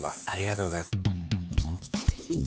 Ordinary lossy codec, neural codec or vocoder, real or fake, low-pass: none; codec, 16 kHz, 4 kbps, X-Codec, HuBERT features, trained on LibriSpeech; fake; none